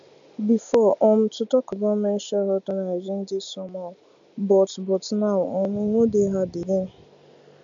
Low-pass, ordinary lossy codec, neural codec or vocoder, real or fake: 7.2 kHz; MP3, 64 kbps; none; real